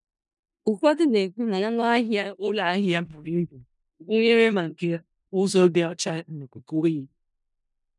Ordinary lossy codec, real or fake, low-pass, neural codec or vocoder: none; fake; 10.8 kHz; codec, 16 kHz in and 24 kHz out, 0.4 kbps, LongCat-Audio-Codec, four codebook decoder